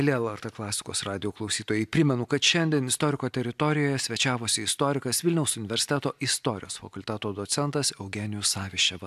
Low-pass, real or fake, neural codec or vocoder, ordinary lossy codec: 14.4 kHz; real; none; AAC, 96 kbps